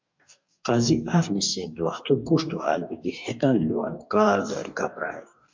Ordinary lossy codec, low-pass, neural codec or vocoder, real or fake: MP3, 64 kbps; 7.2 kHz; codec, 44.1 kHz, 2.6 kbps, DAC; fake